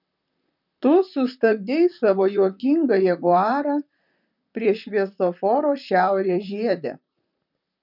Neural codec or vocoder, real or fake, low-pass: vocoder, 44.1 kHz, 128 mel bands, Pupu-Vocoder; fake; 5.4 kHz